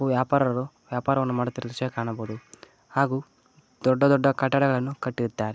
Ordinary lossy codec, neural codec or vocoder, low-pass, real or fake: none; none; none; real